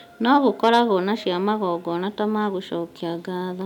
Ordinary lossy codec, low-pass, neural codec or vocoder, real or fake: none; 19.8 kHz; none; real